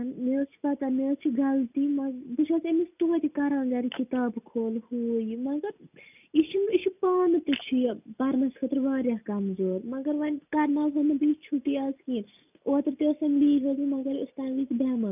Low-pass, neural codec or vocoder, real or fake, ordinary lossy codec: 3.6 kHz; none; real; none